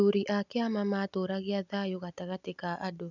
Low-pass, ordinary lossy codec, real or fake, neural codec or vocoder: 7.2 kHz; none; real; none